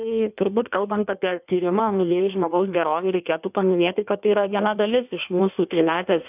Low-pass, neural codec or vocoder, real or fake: 3.6 kHz; codec, 16 kHz in and 24 kHz out, 1.1 kbps, FireRedTTS-2 codec; fake